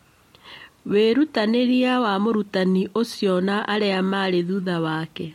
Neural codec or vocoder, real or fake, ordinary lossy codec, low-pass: none; real; MP3, 64 kbps; 19.8 kHz